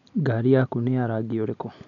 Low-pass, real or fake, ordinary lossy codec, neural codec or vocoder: 7.2 kHz; real; none; none